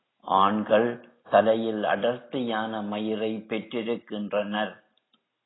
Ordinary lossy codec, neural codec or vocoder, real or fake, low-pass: AAC, 16 kbps; none; real; 7.2 kHz